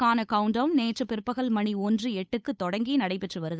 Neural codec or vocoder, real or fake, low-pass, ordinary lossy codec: codec, 16 kHz, 8 kbps, FunCodec, trained on Chinese and English, 25 frames a second; fake; none; none